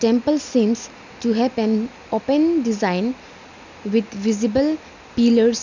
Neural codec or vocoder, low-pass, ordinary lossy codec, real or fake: none; 7.2 kHz; none; real